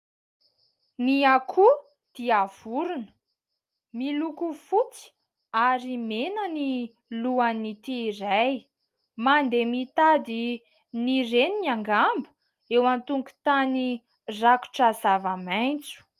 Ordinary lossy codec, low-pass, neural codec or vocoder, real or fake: Opus, 32 kbps; 14.4 kHz; none; real